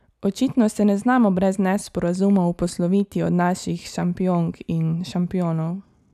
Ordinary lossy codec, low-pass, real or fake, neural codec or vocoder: none; 14.4 kHz; real; none